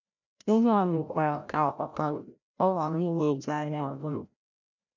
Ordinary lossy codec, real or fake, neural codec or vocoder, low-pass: none; fake; codec, 16 kHz, 0.5 kbps, FreqCodec, larger model; 7.2 kHz